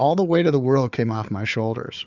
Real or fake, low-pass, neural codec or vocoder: fake; 7.2 kHz; codec, 16 kHz, 4 kbps, FunCodec, trained on Chinese and English, 50 frames a second